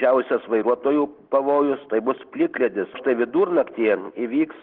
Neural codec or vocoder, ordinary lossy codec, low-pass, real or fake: none; Opus, 16 kbps; 5.4 kHz; real